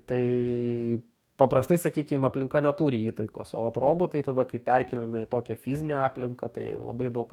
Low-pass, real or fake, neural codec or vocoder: 19.8 kHz; fake; codec, 44.1 kHz, 2.6 kbps, DAC